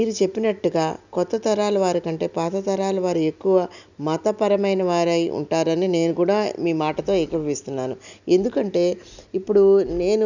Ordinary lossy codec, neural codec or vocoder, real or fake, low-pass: none; none; real; 7.2 kHz